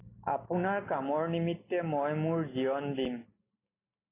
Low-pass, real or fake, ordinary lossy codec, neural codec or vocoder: 3.6 kHz; real; AAC, 16 kbps; none